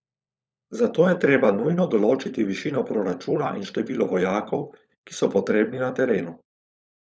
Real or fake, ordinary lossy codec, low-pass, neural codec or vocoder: fake; none; none; codec, 16 kHz, 16 kbps, FunCodec, trained on LibriTTS, 50 frames a second